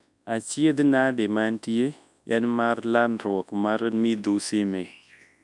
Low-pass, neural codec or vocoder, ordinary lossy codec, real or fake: 10.8 kHz; codec, 24 kHz, 0.9 kbps, WavTokenizer, large speech release; none; fake